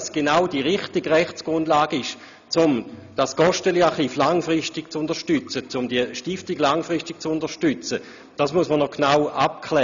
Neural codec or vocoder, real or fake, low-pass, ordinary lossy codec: none; real; 7.2 kHz; none